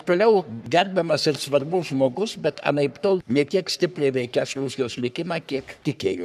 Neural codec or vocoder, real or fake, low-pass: codec, 44.1 kHz, 3.4 kbps, Pupu-Codec; fake; 14.4 kHz